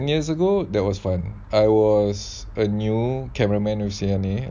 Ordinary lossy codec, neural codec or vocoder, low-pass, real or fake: none; none; none; real